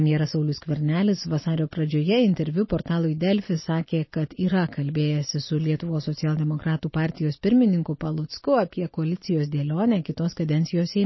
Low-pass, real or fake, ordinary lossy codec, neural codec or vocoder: 7.2 kHz; real; MP3, 24 kbps; none